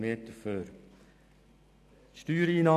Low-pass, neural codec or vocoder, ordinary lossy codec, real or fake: 14.4 kHz; none; none; real